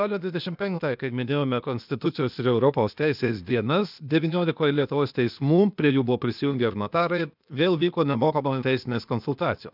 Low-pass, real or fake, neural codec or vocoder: 5.4 kHz; fake; codec, 16 kHz, 0.8 kbps, ZipCodec